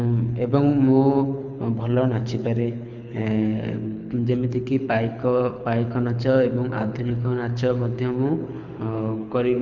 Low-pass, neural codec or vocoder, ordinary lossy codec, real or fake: 7.2 kHz; codec, 24 kHz, 6 kbps, HILCodec; none; fake